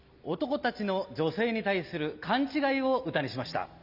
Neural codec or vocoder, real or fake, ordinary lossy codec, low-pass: none; real; Opus, 64 kbps; 5.4 kHz